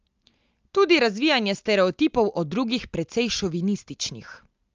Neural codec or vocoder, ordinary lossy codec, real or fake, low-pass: none; Opus, 24 kbps; real; 7.2 kHz